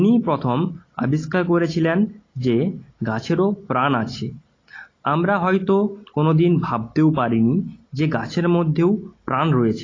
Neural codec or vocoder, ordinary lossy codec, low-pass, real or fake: none; AAC, 32 kbps; 7.2 kHz; real